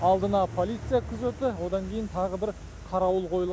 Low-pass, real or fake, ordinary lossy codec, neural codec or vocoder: none; real; none; none